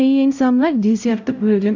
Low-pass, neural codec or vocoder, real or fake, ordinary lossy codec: 7.2 kHz; codec, 16 kHz, 0.5 kbps, X-Codec, HuBERT features, trained on LibriSpeech; fake; none